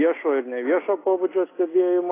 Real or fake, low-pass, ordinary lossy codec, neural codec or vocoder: real; 3.6 kHz; AAC, 16 kbps; none